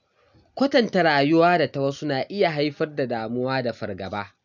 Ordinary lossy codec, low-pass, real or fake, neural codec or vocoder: none; 7.2 kHz; real; none